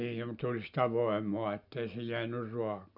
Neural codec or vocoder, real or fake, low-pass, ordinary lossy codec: none; real; 5.4 kHz; none